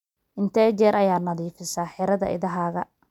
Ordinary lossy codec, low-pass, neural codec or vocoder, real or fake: none; 19.8 kHz; none; real